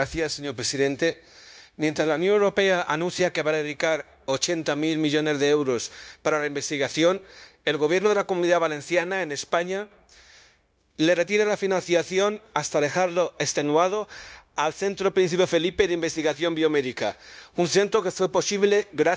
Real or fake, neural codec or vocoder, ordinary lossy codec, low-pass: fake; codec, 16 kHz, 0.9 kbps, LongCat-Audio-Codec; none; none